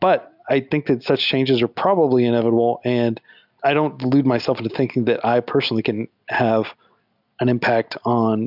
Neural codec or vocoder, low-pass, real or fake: none; 5.4 kHz; real